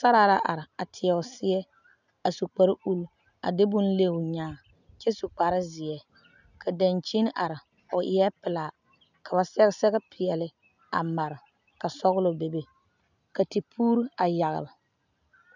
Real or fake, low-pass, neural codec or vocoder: real; 7.2 kHz; none